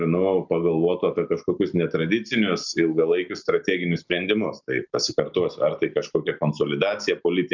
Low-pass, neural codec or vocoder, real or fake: 7.2 kHz; none; real